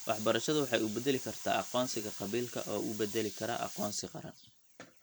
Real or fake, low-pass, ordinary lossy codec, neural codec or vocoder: real; none; none; none